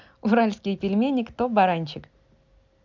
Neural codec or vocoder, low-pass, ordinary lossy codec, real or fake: none; 7.2 kHz; MP3, 64 kbps; real